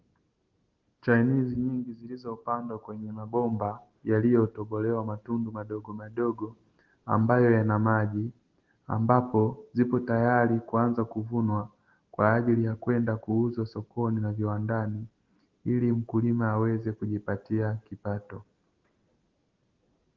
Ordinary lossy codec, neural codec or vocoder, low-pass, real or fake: Opus, 16 kbps; none; 7.2 kHz; real